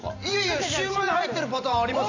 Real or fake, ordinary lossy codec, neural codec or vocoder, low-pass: fake; none; vocoder, 44.1 kHz, 128 mel bands every 512 samples, BigVGAN v2; 7.2 kHz